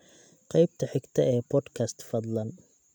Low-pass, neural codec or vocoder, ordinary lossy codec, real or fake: 19.8 kHz; none; none; real